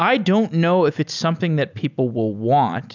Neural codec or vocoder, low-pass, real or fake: none; 7.2 kHz; real